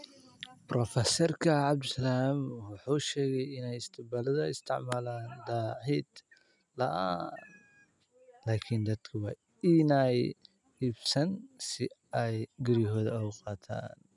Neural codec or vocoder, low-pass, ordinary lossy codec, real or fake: none; 10.8 kHz; none; real